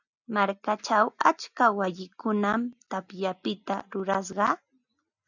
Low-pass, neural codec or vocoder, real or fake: 7.2 kHz; none; real